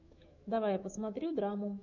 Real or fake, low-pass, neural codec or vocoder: fake; 7.2 kHz; autoencoder, 48 kHz, 128 numbers a frame, DAC-VAE, trained on Japanese speech